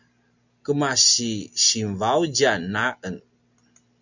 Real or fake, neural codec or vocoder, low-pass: real; none; 7.2 kHz